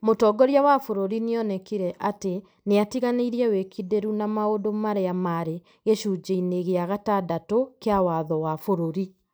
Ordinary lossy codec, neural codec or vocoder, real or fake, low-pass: none; none; real; none